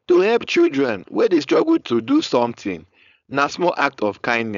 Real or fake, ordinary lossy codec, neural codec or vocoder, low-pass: fake; none; codec, 16 kHz, 4.8 kbps, FACodec; 7.2 kHz